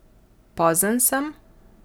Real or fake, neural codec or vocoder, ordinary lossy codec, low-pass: real; none; none; none